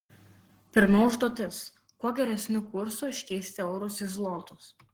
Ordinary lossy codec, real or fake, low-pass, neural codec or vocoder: Opus, 16 kbps; fake; 19.8 kHz; codec, 44.1 kHz, 7.8 kbps, Pupu-Codec